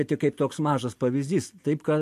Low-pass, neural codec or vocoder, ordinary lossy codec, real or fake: 14.4 kHz; none; MP3, 64 kbps; real